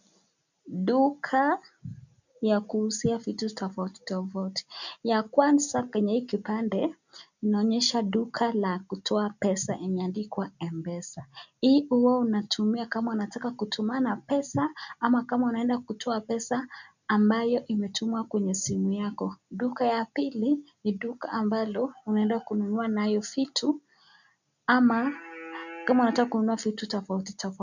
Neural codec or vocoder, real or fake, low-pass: none; real; 7.2 kHz